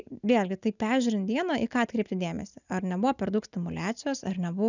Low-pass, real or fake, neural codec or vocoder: 7.2 kHz; real; none